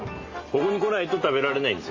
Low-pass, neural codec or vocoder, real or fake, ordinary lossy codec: 7.2 kHz; none; real; Opus, 32 kbps